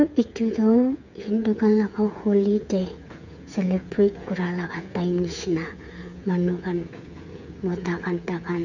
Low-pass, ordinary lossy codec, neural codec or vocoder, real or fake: 7.2 kHz; none; codec, 16 kHz, 8 kbps, FreqCodec, smaller model; fake